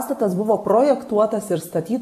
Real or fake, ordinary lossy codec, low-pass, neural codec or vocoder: real; MP3, 96 kbps; 14.4 kHz; none